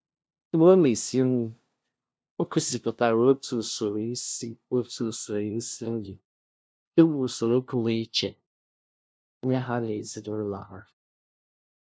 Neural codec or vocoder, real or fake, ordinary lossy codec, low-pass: codec, 16 kHz, 0.5 kbps, FunCodec, trained on LibriTTS, 25 frames a second; fake; none; none